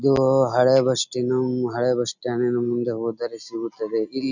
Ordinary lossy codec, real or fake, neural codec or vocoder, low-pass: none; real; none; 7.2 kHz